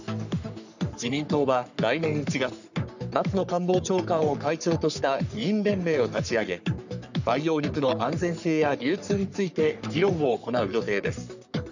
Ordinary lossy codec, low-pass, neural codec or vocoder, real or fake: none; 7.2 kHz; codec, 44.1 kHz, 3.4 kbps, Pupu-Codec; fake